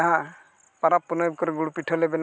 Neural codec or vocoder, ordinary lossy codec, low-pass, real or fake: none; none; none; real